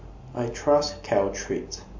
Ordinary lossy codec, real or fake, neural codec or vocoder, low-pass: MP3, 48 kbps; real; none; 7.2 kHz